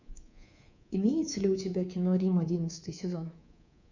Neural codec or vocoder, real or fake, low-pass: codec, 24 kHz, 3.1 kbps, DualCodec; fake; 7.2 kHz